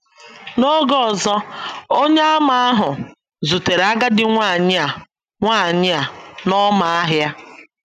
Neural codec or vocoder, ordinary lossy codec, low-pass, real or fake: none; none; 14.4 kHz; real